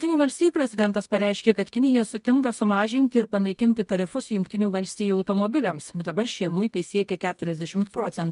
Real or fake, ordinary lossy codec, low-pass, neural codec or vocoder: fake; MP3, 64 kbps; 10.8 kHz; codec, 24 kHz, 0.9 kbps, WavTokenizer, medium music audio release